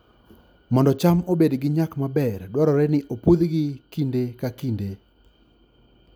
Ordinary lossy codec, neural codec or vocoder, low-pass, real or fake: none; none; none; real